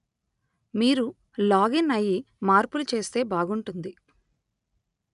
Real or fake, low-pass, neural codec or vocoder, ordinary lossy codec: real; 10.8 kHz; none; none